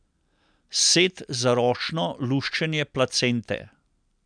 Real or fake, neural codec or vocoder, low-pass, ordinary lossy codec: real; none; 9.9 kHz; none